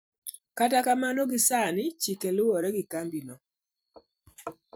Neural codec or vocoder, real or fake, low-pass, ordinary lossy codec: vocoder, 44.1 kHz, 128 mel bands every 256 samples, BigVGAN v2; fake; none; none